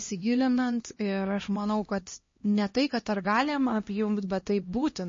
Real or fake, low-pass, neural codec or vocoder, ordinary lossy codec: fake; 7.2 kHz; codec, 16 kHz, 1 kbps, X-Codec, HuBERT features, trained on LibriSpeech; MP3, 32 kbps